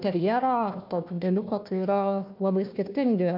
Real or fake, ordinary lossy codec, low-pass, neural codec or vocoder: fake; MP3, 48 kbps; 5.4 kHz; codec, 16 kHz, 1 kbps, FunCodec, trained on Chinese and English, 50 frames a second